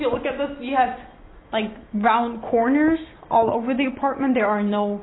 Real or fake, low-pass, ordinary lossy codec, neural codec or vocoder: real; 7.2 kHz; AAC, 16 kbps; none